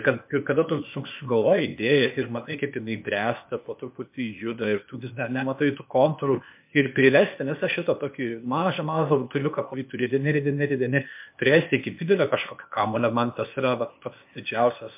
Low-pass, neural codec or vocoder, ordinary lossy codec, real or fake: 3.6 kHz; codec, 16 kHz, 0.8 kbps, ZipCodec; MP3, 32 kbps; fake